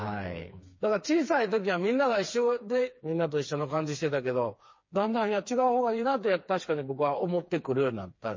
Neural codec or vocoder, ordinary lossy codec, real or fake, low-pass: codec, 16 kHz, 4 kbps, FreqCodec, smaller model; MP3, 32 kbps; fake; 7.2 kHz